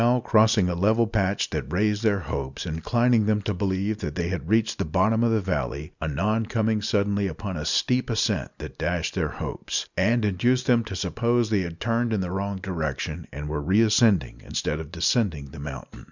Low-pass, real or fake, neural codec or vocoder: 7.2 kHz; real; none